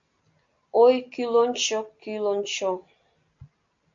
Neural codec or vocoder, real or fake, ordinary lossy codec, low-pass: none; real; MP3, 48 kbps; 7.2 kHz